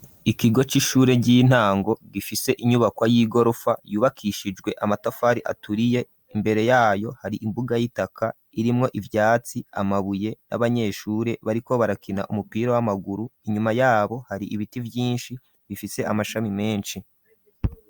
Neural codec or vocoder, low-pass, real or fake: none; 19.8 kHz; real